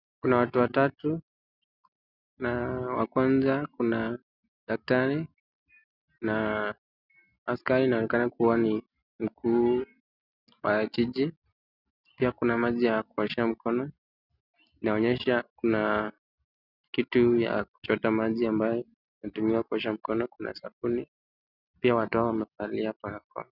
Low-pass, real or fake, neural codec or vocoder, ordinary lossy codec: 5.4 kHz; real; none; Opus, 64 kbps